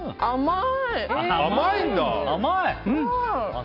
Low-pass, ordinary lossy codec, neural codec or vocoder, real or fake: 5.4 kHz; none; none; real